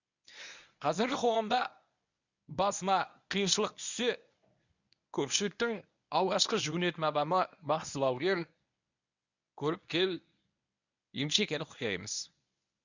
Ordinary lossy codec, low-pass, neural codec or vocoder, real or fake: none; 7.2 kHz; codec, 24 kHz, 0.9 kbps, WavTokenizer, medium speech release version 1; fake